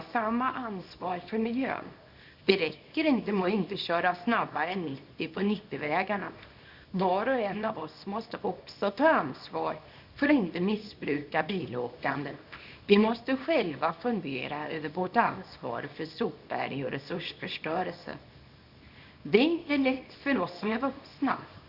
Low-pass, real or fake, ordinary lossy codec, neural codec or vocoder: 5.4 kHz; fake; Opus, 64 kbps; codec, 24 kHz, 0.9 kbps, WavTokenizer, small release